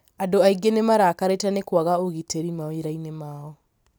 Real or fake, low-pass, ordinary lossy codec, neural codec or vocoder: fake; none; none; vocoder, 44.1 kHz, 128 mel bands every 256 samples, BigVGAN v2